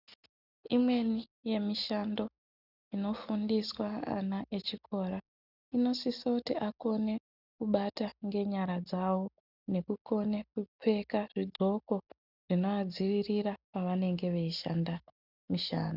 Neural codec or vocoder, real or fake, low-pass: none; real; 5.4 kHz